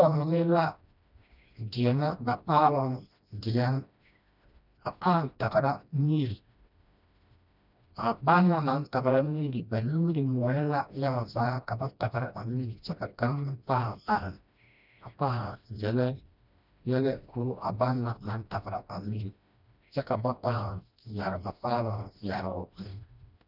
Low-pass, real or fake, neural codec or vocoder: 5.4 kHz; fake; codec, 16 kHz, 1 kbps, FreqCodec, smaller model